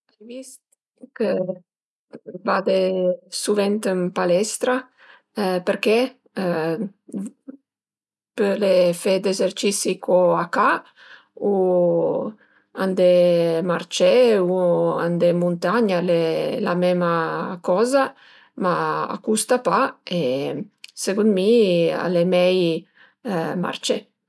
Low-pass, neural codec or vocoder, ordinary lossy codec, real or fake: none; none; none; real